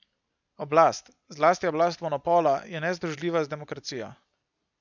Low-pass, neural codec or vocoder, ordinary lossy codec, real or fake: 7.2 kHz; none; none; real